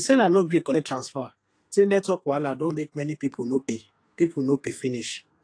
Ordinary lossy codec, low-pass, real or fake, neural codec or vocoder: AAC, 48 kbps; 9.9 kHz; fake; codec, 32 kHz, 1.9 kbps, SNAC